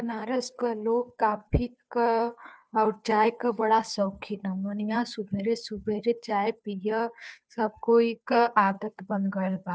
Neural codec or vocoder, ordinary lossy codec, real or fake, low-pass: codec, 16 kHz, 2 kbps, FunCodec, trained on Chinese and English, 25 frames a second; none; fake; none